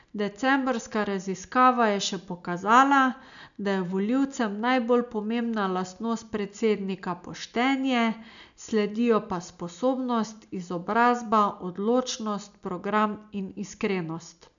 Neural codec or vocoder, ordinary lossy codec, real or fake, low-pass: none; none; real; 7.2 kHz